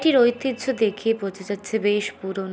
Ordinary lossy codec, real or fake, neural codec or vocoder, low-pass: none; real; none; none